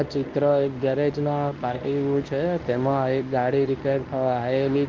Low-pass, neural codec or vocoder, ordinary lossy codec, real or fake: 7.2 kHz; codec, 24 kHz, 0.9 kbps, WavTokenizer, medium speech release version 1; Opus, 24 kbps; fake